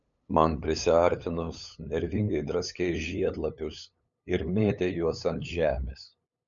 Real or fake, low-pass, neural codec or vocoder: fake; 7.2 kHz; codec, 16 kHz, 8 kbps, FunCodec, trained on LibriTTS, 25 frames a second